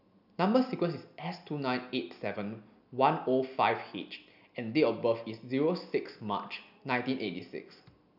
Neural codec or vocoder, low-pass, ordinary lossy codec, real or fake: none; 5.4 kHz; none; real